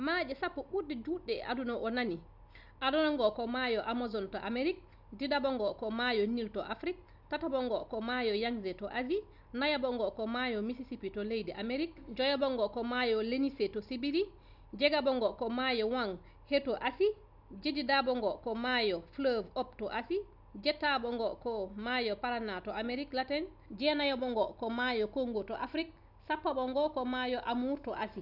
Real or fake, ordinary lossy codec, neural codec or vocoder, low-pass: real; none; none; 5.4 kHz